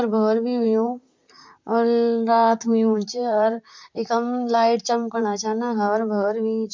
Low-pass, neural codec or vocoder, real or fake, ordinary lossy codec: 7.2 kHz; vocoder, 44.1 kHz, 128 mel bands, Pupu-Vocoder; fake; MP3, 48 kbps